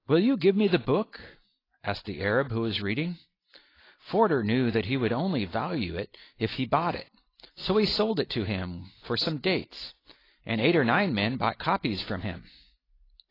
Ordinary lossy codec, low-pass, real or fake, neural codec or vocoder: AAC, 24 kbps; 5.4 kHz; real; none